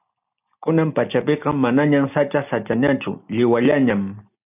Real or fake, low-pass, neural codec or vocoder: real; 3.6 kHz; none